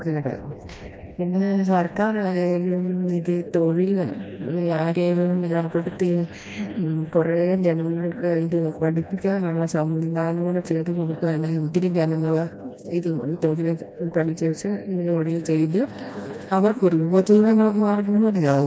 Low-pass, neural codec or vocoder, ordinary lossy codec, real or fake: none; codec, 16 kHz, 1 kbps, FreqCodec, smaller model; none; fake